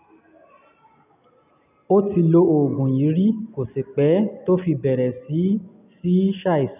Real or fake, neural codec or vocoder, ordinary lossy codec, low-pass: real; none; none; 3.6 kHz